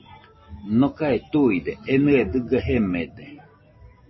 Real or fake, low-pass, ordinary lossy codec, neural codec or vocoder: real; 7.2 kHz; MP3, 24 kbps; none